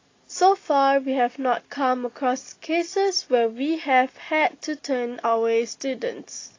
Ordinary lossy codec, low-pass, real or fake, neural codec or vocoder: AAC, 32 kbps; 7.2 kHz; real; none